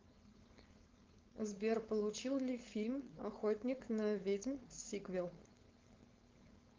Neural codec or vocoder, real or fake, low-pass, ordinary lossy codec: codec, 16 kHz, 4.8 kbps, FACodec; fake; 7.2 kHz; Opus, 32 kbps